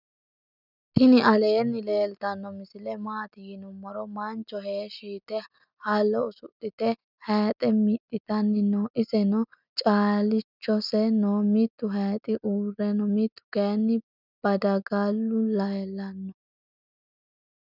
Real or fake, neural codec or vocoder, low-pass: real; none; 5.4 kHz